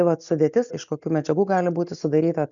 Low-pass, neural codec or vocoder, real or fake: 7.2 kHz; none; real